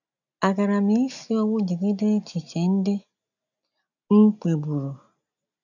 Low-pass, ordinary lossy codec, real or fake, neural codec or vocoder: 7.2 kHz; none; real; none